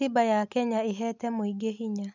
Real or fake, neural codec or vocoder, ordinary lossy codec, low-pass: real; none; none; 7.2 kHz